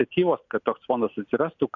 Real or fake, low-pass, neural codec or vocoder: real; 7.2 kHz; none